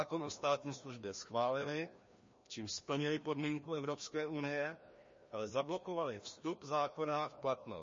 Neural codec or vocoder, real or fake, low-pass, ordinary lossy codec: codec, 16 kHz, 1 kbps, FreqCodec, larger model; fake; 7.2 kHz; MP3, 32 kbps